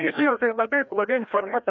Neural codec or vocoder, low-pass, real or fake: codec, 16 kHz, 1 kbps, FreqCodec, larger model; 7.2 kHz; fake